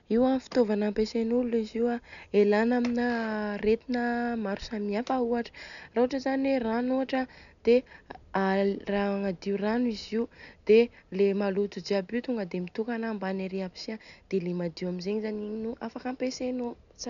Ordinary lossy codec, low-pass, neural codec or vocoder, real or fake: none; 7.2 kHz; none; real